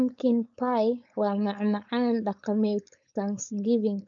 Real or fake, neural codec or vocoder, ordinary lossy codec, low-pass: fake; codec, 16 kHz, 4.8 kbps, FACodec; none; 7.2 kHz